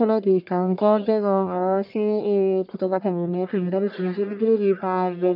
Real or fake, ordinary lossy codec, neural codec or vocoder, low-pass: fake; none; codec, 44.1 kHz, 1.7 kbps, Pupu-Codec; 5.4 kHz